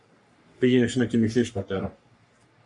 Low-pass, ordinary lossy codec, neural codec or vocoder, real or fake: 10.8 kHz; MP3, 64 kbps; codec, 44.1 kHz, 3.4 kbps, Pupu-Codec; fake